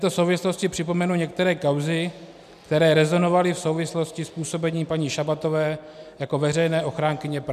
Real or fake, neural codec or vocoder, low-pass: real; none; 14.4 kHz